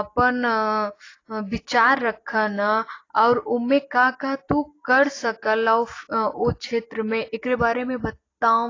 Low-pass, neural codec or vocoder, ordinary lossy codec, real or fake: 7.2 kHz; none; AAC, 32 kbps; real